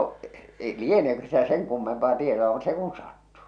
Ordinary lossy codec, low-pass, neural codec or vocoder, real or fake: none; 9.9 kHz; none; real